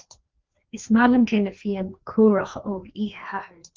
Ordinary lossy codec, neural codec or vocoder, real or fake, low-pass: Opus, 32 kbps; codec, 16 kHz, 2 kbps, FreqCodec, larger model; fake; 7.2 kHz